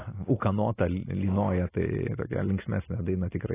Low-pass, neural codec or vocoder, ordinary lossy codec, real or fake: 3.6 kHz; none; AAC, 16 kbps; real